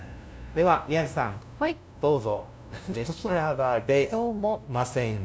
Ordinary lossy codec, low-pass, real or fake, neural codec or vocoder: none; none; fake; codec, 16 kHz, 0.5 kbps, FunCodec, trained on LibriTTS, 25 frames a second